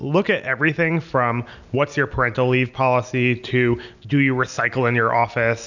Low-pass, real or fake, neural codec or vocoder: 7.2 kHz; real; none